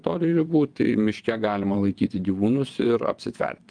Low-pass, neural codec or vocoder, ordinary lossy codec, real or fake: 9.9 kHz; vocoder, 22.05 kHz, 80 mel bands, WaveNeXt; Opus, 32 kbps; fake